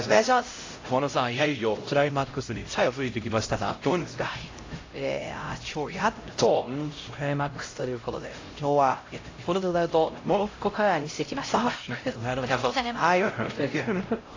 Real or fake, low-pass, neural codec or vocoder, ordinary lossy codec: fake; 7.2 kHz; codec, 16 kHz, 0.5 kbps, X-Codec, HuBERT features, trained on LibriSpeech; AAC, 32 kbps